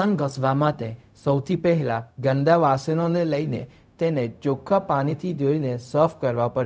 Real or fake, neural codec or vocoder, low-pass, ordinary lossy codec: fake; codec, 16 kHz, 0.4 kbps, LongCat-Audio-Codec; none; none